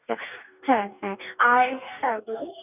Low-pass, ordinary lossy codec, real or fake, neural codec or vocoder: 3.6 kHz; none; fake; codec, 44.1 kHz, 2.6 kbps, DAC